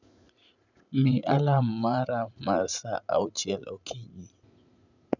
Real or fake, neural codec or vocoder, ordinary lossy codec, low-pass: fake; vocoder, 44.1 kHz, 80 mel bands, Vocos; none; 7.2 kHz